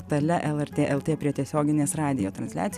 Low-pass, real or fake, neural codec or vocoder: 14.4 kHz; real; none